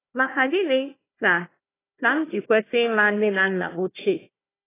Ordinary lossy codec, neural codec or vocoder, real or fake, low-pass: AAC, 16 kbps; codec, 16 kHz, 1 kbps, FunCodec, trained on Chinese and English, 50 frames a second; fake; 3.6 kHz